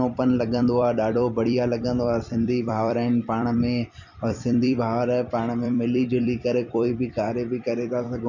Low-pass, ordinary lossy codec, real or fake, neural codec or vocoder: 7.2 kHz; none; fake; vocoder, 44.1 kHz, 128 mel bands every 256 samples, BigVGAN v2